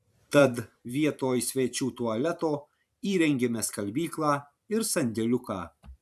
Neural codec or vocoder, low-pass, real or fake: vocoder, 44.1 kHz, 128 mel bands every 512 samples, BigVGAN v2; 14.4 kHz; fake